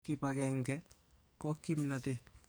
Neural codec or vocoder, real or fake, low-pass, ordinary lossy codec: codec, 44.1 kHz, 2.6 kbps, SNAC; fake; none; none